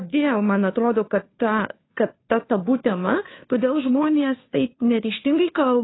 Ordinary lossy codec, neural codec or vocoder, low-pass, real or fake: AAC, 16 kbps; codec, 16 kHz, 2 kbps, FunCodec, trained on Chinese and English, 25 frames a second; 7.2 kHz; fake